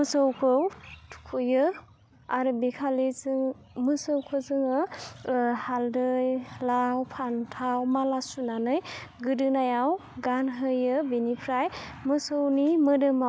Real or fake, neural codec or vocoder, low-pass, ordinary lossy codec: real; none; none; none